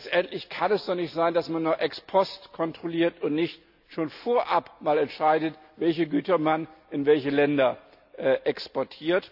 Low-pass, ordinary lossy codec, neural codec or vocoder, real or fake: 5.4 kHz; AAC, 48 kbps; none; real